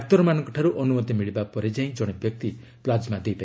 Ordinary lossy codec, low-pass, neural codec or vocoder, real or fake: none; none; none; real